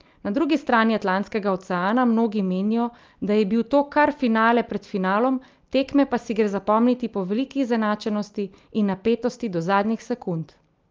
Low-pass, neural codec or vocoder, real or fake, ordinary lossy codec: 7.2 kHz; none; real; Opus, 24 kbps